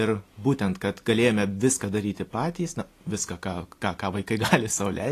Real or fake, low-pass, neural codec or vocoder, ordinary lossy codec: real; 14.4 kHz; none; AAC, 48 kbps